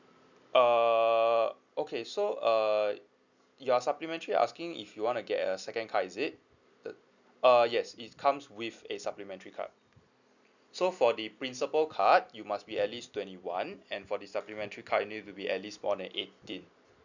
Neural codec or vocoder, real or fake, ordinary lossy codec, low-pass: none; real; none; 7.2 kHz